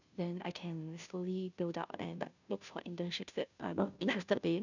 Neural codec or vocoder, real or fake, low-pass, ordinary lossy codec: codec, 16 kHz, 0.5 kbps, FunCodec, trained on Chinese and English, 25 frames a second; fake; 7.2 kHz; none